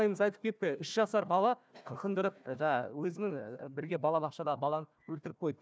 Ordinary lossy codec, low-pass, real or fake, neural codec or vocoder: none; none; fake; codec, 16 kHz, 1 kbps, FunCodec, trained on Chinese and English, 50 frames a second